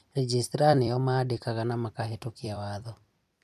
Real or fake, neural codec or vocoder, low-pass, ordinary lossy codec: fake; vocoder, 44.1 kHz, 128 mel bands every 256 samples, BigVGAN v2; 14.4 kHz; none